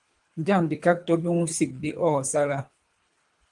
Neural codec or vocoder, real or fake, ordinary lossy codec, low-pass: codec, 24 kHz, 3 kbps, HILCodec; fake; Opus, 32 kbps; 10.8 kHz